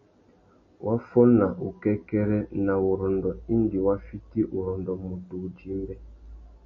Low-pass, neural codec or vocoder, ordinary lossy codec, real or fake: 7.2 kHz; none; MP3, 32 kbps; real